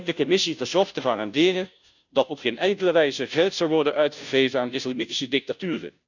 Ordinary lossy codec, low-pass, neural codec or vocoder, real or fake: none; 7.2 kHz; codec, 16 kHz, 0.5 kbps, FunCodec, trained on Chinese and English, 25 frames a second; fake